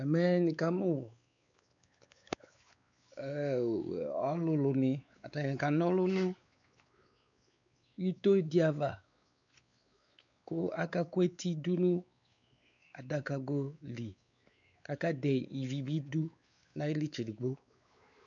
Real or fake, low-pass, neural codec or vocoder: fake; 7.2 kHz; codec, 16 kHz, 4 kbps, X-Codec, WavLM features, trained on Multilingual LibriSpeech